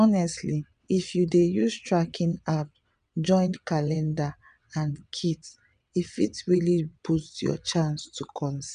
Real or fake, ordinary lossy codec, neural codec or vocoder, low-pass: fake; none; vocoder, 22.05 kHz, 80 mel bands, WaveNeXt; 9.9 kHz